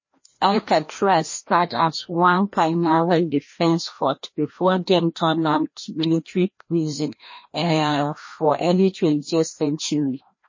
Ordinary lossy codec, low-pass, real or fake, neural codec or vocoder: MP3, 32 kbps; 7.2 kHz; fake; codec, 16 kHz, 1 kbps, FreqCodec, larger model